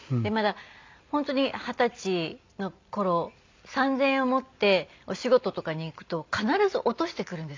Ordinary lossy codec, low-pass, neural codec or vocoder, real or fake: MP3, 64 kbps; 7.2 kHz; none; real